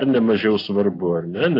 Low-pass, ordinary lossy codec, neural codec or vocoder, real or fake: 5.4 kHz; AAC, 32 kbps; none; real